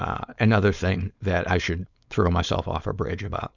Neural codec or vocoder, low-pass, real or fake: codec, 16 kHz, 4.8 kbps, FACodec; 7.2 kHz; fake